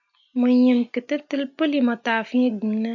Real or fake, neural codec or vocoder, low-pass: real; none; 7.2 kHz